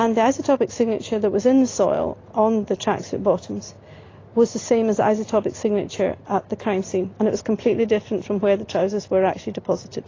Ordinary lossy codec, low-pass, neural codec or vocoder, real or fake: AAC, 32 kbps; 7.2 kHz; none; real